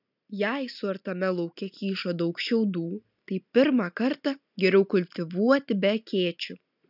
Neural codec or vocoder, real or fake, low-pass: none; real; 5.4 kHz